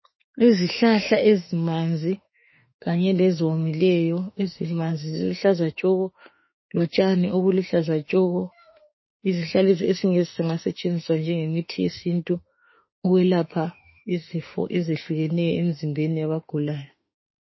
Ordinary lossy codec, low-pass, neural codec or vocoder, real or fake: MP3, 24 kbps; 7.2 kHz; autoencoder, 48 kHz, 32 numbers a frame, DAC-VAE, trained on Japanese speech; fake